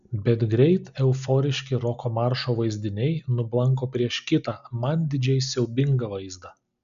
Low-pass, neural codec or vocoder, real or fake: 7.2 kHz; none; real